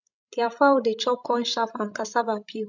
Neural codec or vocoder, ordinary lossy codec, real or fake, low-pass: codec, 16 kHz, 16 kbps, FreqCodec, larger model; none; fake; 7.2 kHz